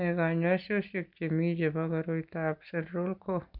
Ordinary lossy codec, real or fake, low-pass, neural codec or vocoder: none; real; 5.4 kHz; none